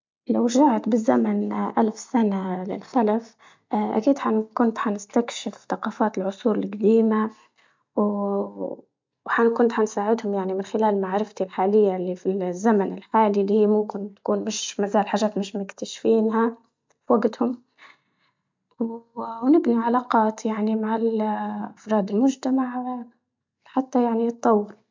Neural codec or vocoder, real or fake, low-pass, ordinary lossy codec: none; real; 7.2 kHz; MP3, 64 kbps